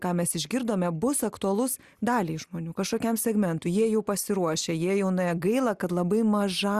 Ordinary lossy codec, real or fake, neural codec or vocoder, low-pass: Opus, 64 kbps; real; none; 14.4 kHz